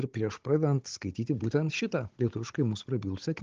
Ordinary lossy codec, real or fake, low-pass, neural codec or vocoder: Opus, 16 kbps; fake; 7.2 kHz; codec, 16 kHz, 16 kbps, FunCodec, trained on Chinese and English, 50 frames a second